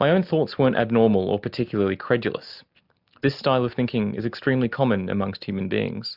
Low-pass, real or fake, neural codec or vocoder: 5.4 kHz; real; none